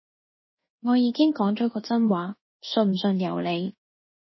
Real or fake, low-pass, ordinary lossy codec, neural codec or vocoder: fake; 7.2 kHz; MP3, 24 kbps; codec, 24 kHz, 1.2 kbps, DualCodec